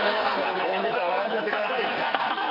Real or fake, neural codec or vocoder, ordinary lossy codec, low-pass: fake; codec, 44.1 kHz, 2.6 kbps, SNAC; none; 5.4 kHz